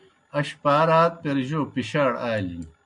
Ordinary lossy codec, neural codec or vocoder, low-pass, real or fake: MP3, 64 kbps; none; 10.8 kHz; real